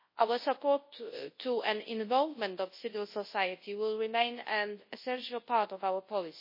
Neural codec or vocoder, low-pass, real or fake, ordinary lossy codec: codec, 24 kHz, 0.9 kbps, WavTokenizer, large speech release; 5.4 kHz; fake; MP3, 24 kbps